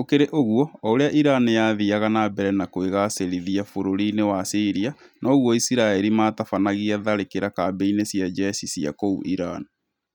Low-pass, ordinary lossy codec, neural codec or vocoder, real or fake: 19.8 kHz; none; none; real